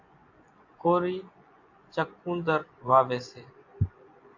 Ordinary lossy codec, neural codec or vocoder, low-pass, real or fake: AAC, 48 kbps; vocoder, 24 kHz, 100 mel bands, Vocos; 7.2 kHz; fake